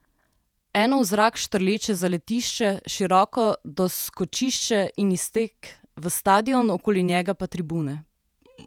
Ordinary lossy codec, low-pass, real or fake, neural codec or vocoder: none; 19.8 kHz; fake; vocoder, 48 kHz, 128 mel bands, Vocos